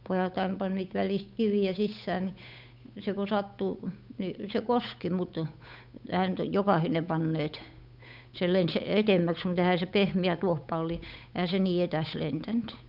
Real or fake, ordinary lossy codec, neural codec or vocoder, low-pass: fake; none; codec, 16 kHz, 8 kbps, FunCodec, trained on Chinese and English, 25 frames a second; 5.4 kHz